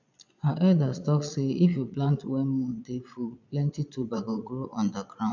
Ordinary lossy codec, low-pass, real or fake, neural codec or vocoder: none; 7.2 kHz; fake; vocoder, 22.05 kHz, 80 mel bands, Vocos